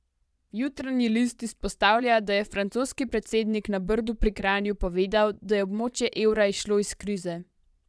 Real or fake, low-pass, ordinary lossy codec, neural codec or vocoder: fake; none; none; vocoder, 22.05 kHz, 80 mel bands, Vocos